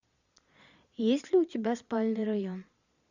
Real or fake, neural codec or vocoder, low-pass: fake; vocoder, 22.05 kHz, 80 mel bands, Vocos; 7.2 kHz